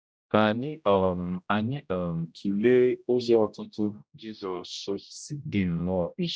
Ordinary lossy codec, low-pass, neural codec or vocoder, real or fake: none; none; codec, 16 kHz, 0.5 kbps, X-Codec, HuBERT features, trained on general audio; fake